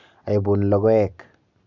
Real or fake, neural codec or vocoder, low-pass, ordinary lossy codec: real; none; 7.2 kHz; none